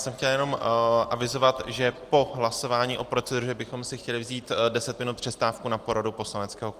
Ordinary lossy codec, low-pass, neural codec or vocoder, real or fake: Opus, 24 kbps; 14.4 kHz; none; real